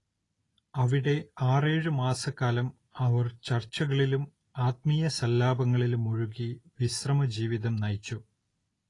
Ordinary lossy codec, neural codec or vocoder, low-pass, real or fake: AAC, 32 kbps; none; 10.8 kHz; real